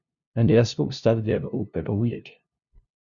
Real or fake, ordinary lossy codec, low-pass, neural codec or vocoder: fake; Opus, 64 kbps; 7.2 kHz; codec, 16 kHz, 0.5 kbps, FunCodec, trained on LibriTTS, 25 frames a second